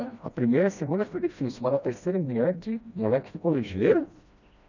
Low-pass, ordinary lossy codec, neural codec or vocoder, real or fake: 7.2 kHz; none; codec, 16 kHz, 1 kbps, FreqCodec, smaller model; fake